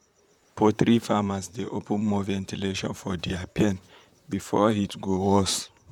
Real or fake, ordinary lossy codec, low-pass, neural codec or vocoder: fake; none; 19.8 kHz; vocoder, 44.1 kHz, 128 mel bands, Pupu-Vocoder